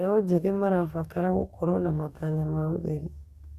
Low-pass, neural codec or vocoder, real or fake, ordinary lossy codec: 14.4 kHz; codec, 44.1 kHz, 2.6 kbps, DAC; fake; Opus, 64 kbps